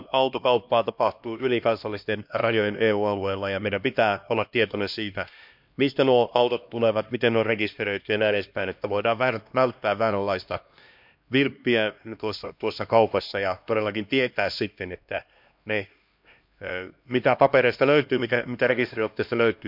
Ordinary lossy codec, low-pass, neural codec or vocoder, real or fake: MP3, 48 kbps; 5.4 kHz; codec, 16 kHz, 1 kbps, X-Codec, HuBERT features, trained on LibriSpeech; fake